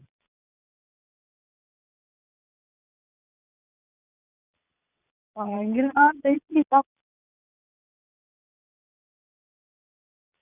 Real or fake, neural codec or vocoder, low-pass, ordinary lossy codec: fake; vocoder, 22.05 kHz, 80 mel bands, Vocos; 3.6 kHz; none